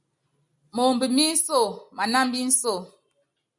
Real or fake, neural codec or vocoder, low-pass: real; none; 10.8 kHz